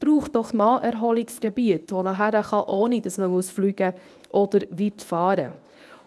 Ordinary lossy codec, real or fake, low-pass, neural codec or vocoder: none; fake; none; codec, 24 kHz, 0.9 kbps, WavTokenizer, medium speech release version 1